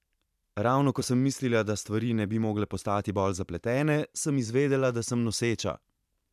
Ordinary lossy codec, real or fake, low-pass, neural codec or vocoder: none; fake; 14.4 kHz; vocoder, 44.1 kHz, 128 mel bands every 512 samples, BigVGAN v2